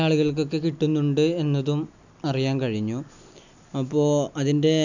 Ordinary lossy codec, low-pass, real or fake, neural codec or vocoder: none; 7.2 kHz; real; none